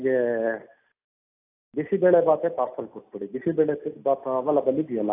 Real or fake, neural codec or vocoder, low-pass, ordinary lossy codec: real; none; 3.6 kHz; AAC, 24 kbps